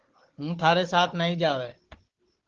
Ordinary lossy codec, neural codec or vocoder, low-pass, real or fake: Opus, 16 kbps; codec, 16 kHz, 4 kbps, FunCodec, trained on Chinese and English, 50 frames a second; 7.2 kHz; fake